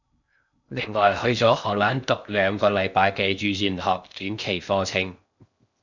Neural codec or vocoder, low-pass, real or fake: codec, 16 kHz in and 24 kHz out, 0.6 kbps, FocalCodec, streaming, 2048 codes; 7.2 kHz; fake